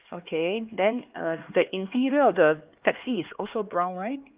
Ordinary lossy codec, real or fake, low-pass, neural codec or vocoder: Opus, 32 kbps; fake; 3.6 kHz; codec, 16 kHz, 2 kbps, X-Codec, HuBERT features, trained on LibriSpeech